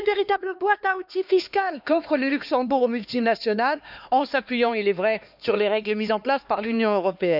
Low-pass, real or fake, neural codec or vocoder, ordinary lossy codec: 5.4 kHz; fake; codec, 16 kHz, 2 kbps, X-Codec, HuBERT features, trained on LibriSpeech; none